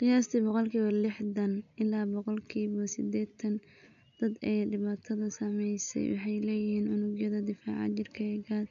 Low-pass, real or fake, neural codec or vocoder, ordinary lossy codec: 7.2 kHz; real; none; none